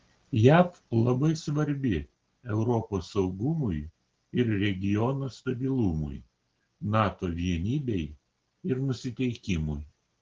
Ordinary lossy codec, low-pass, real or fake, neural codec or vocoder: Opus, 16 kbps; 7.2 kHz; real; none